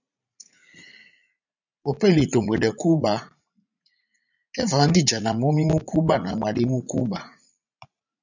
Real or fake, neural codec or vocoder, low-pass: fake; vocoder, 44.1 kHz, 80 mel bands, Vocos; 7.2 kHz